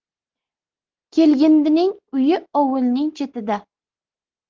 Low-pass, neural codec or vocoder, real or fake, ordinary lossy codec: 7.2 kHz; none; real; Opus, 16 kbps